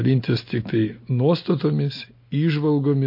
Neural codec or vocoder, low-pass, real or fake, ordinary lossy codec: none; 5.4 kHz; real; MP3, 32 kbps